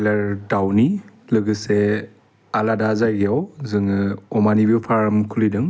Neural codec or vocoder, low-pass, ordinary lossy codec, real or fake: none; none; none; real